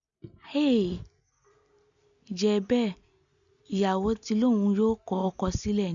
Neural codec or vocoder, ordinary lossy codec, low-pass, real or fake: none; none; 7.2 kHz; real